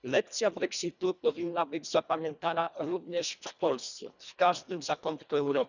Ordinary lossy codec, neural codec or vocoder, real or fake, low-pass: none; codec, 24 kHz, 1.5 kbps, HILCodec; fake; 7.2 kHz